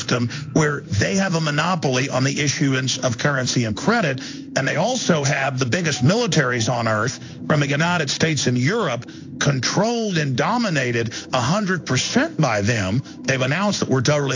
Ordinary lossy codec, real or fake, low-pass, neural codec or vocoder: AAC, 48 kbps; fake; 7.2 kHz; codec, 16 kHz in and 24 kHz out, 1 kbps, XY-Tokenizer